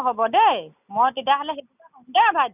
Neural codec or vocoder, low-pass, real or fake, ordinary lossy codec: none; 3.6 kHz; real; none